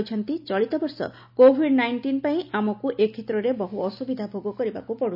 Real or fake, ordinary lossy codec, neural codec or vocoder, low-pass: real; none; none; 5.4 kHz